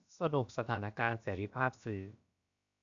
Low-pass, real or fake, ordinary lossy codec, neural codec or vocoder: 7.2 kHz; fake; AAC, 64 kbps; codec, 16 kHz, about 1 kbps, DyCAST, with the encoder's durations